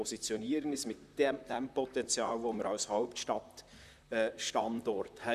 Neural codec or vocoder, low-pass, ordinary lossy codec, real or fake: vocoder, 44.1 kHz, 128 mel bands, Pupu-Vocoder; 14.4 kHz; none; fake